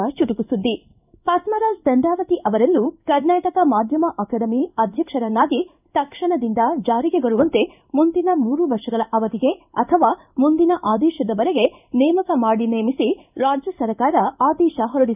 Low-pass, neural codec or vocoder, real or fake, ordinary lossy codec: 3.6 kHz; codec, 16 kHz in and 24 kHz out, 1 kbps, XY-Tokenizer; fake; none